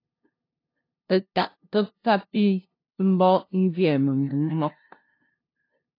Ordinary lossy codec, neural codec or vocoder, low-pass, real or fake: AAC, 32 kbps; codec, 16 kHz, 0.5 kbps, FunCodec, trained on LibriTTS, 25 frames a second; 5.4 kHz; fake